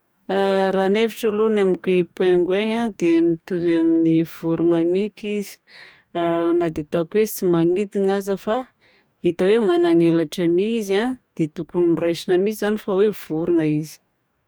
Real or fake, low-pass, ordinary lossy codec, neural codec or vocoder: fake; none; none; codec, 44.1 kHz, 2.6 kbps, DAC